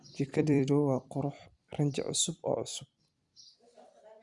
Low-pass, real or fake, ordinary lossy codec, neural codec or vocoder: 10.8 kHz; fake; Opus, 64 kbps; vocoder, 44.1 kHz, 128 mel bands every 256 samples, BigVGAN v2